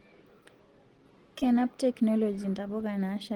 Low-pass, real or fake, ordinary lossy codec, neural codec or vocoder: 19.8 kHz; real; Opus, 16 kbps; none